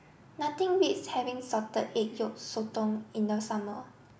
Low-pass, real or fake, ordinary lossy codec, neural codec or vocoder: none; real; none; none